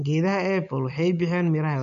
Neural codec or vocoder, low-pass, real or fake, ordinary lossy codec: none; 7.2 kHz; real; none